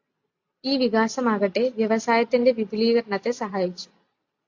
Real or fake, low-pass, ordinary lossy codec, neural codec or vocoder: real; 7.2 kHz; AAC, 48 kbps; none